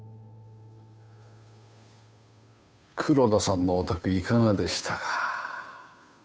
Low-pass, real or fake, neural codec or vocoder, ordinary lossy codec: none; fake; codec, 16 kHz, 2 kbps, FunCodec, trained on Chinese and English, 25 frames a second; none